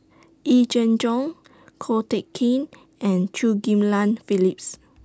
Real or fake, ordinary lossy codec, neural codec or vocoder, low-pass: real; none; none; none